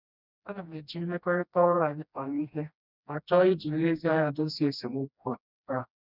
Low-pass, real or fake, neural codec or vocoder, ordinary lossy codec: 5.4 kHz; fake; codec, 16 kHz, 1 kbps, FreqCodec, smaller model; none